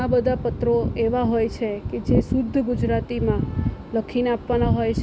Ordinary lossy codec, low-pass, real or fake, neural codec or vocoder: none; none; real; none